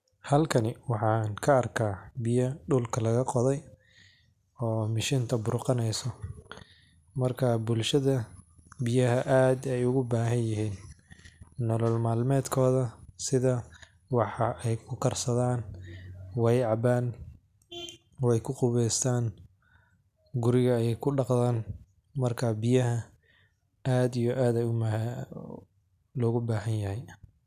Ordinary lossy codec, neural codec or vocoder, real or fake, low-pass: none; none; real; 14.4 kHz